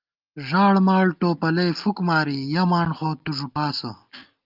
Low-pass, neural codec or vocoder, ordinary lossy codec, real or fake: 5.4 kHz; none; Opus, 24 kbps; real